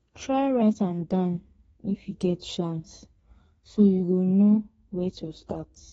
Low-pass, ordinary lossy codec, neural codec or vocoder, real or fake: 14.4 kHz; AAC, 24 kbps; codec, 32 kHz, 1.9 kbps, SNAC; fake